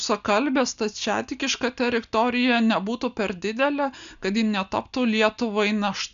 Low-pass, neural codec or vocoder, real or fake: 7.2 kHz; none; real